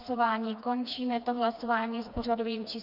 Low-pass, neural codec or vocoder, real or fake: 5.4 kHz; codec, 16 kHz, 2 kbps, FreqCodec, smaller model; fake